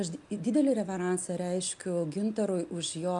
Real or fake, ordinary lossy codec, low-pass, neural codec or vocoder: real; MP3, 96 kbps; 10.8 kHz; none